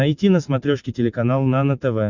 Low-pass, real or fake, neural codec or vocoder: 7.2 kHz; real; none